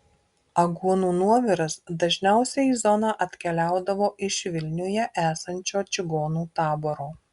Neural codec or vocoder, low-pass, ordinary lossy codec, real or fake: none; 10.8 kHz; Opus, 64 kbps; real